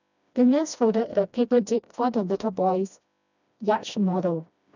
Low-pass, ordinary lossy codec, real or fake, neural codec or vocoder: 7.2 kHz; none; fake; codec, 16 kHz, 1 kbps, FreqCodec, smaller model